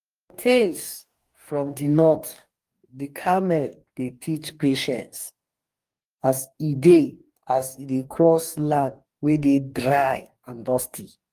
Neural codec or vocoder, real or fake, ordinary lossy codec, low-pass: codec, 44.1 kHz, 2.6 kbps, DAC; fake; Opus, 32 kbps; 14.4 kHz